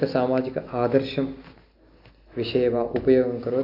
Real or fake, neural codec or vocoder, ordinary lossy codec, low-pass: real; none; AAC, 48 kbps; 5.4 kHz